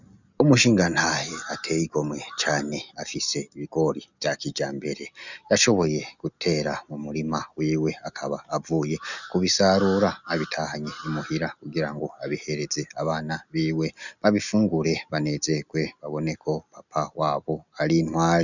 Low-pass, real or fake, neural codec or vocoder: 7.2 kHz; real; none